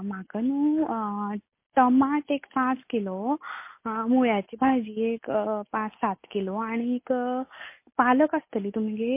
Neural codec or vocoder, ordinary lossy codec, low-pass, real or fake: none; MP3, 24 kbps; 3.6 kHz; real